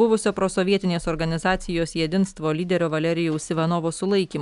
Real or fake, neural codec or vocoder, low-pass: real; none; 10.8 kHz